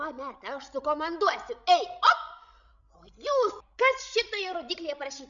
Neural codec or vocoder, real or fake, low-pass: codec, 16 kHz, 16 kbps, FreqCodec, larger model; fake; 7.2 kHz